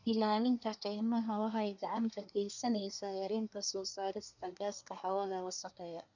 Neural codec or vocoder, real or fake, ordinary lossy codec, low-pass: codec, 24 kHz, 1 kbps, SNAC; fake; none; 7.2 kHz